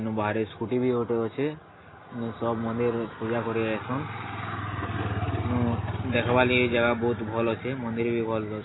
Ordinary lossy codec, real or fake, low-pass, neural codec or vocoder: AAC, 16 kbps; real; 7.2 kHz; none